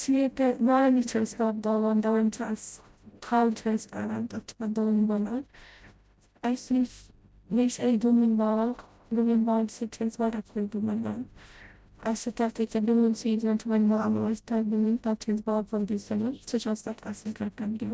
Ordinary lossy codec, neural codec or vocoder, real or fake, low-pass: none; codec, 16 kHz, 0.5 kbps, FreqCodec, smaller model; fake; none